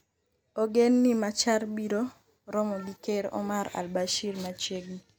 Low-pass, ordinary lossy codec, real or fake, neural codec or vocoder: none; none; real; none